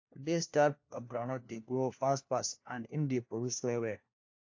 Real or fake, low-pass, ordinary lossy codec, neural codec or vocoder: fake; 7.2 kHz; AAC, 48 kbps; codec, 16 kHz, 1 kbps, FunCodec, trained on LibriTTS, 50 frames a second